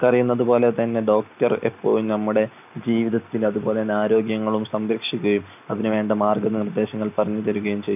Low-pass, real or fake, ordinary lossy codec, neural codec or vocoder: 3.6 kHz; real; none; none